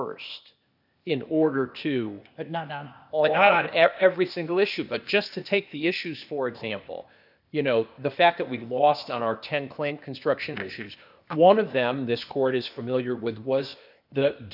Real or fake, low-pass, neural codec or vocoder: fake; 5.4 kHz; codec, 16 kHz, 0.8 kbps, ZipCodec